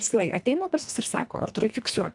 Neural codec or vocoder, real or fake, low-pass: codec, 24 kHz, 1.5 kbps, HILCodec; fake; 10.8 kHz